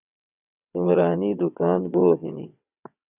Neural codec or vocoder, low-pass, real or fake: vocoder, 22.05 kHz, 80 mel bands, WaveNeXt; 3.6 kHz; fake